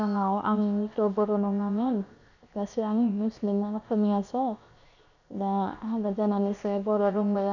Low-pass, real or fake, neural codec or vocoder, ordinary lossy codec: 7.2 kHz; fake; codec, 16 kHz, 0.7 kbps, FocalCodec; none